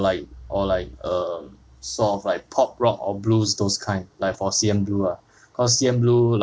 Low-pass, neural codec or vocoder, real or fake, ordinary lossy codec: none; none; real; none